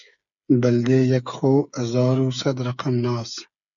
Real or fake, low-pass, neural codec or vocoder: fake; 7.2 kHz; codec, 16 kHz, 8 kbps, FreqCodec, smaller model